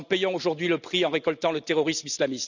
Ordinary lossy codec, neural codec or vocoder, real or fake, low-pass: none; none; real; 7.2 kHz